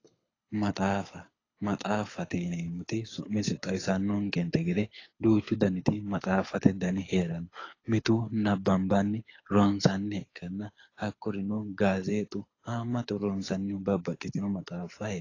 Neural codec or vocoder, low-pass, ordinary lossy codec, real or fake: codec, 24 kHz, 6 kbps, HILCodec; 7.2 kHz; AAC, 32 kbps; fake